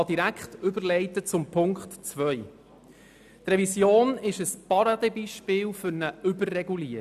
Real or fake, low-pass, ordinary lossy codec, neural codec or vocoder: real; 14.4 kHz; none; none